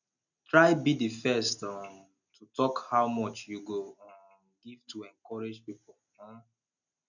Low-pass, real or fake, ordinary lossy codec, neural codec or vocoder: 7.2 kHz; real; none; none